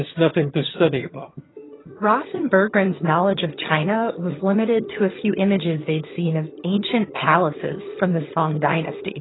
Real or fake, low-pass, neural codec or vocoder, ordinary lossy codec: fake; 7.2 kHz; vocoder, 22.05 kHz, 80 mel bands, HiFi-GAN; AAC, 16 kbps